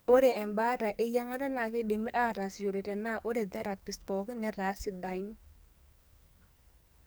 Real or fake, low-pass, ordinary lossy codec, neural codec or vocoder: fake; none; none; codec, 44.1 kHz, 2.6 kbps, SNAC